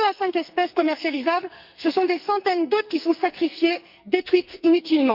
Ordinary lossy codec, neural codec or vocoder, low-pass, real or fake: Opus, 64 kbps; codec, 44.1 kHz, 2.6 kbps, SNAC; 5.4 kHz; fake